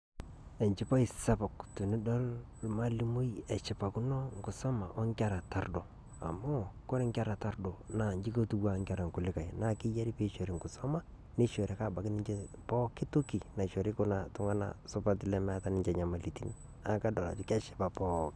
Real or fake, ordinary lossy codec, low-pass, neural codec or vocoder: real; none; none; none